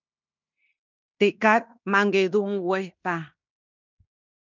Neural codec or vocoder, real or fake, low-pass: codec, 16 kHz in and 24 kHz out, 0.9 kbps, LongCat-Audio-Codec, fine tuned four codebook decoder; fake; 7.2 kHz